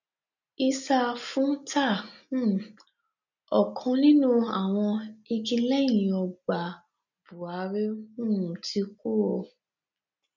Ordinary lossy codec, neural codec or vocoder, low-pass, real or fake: none; none; 7.2 kHz; real